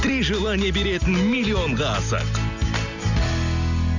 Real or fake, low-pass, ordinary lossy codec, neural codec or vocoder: real; 7.2 kHz; none; none